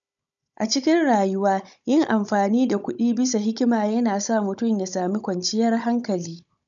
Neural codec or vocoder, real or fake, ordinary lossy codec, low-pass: codec, 16 kHz, 16 kbps, FunCodec, trained on Chinese and English, 50 frames a second; fake; none; 7.2 kHz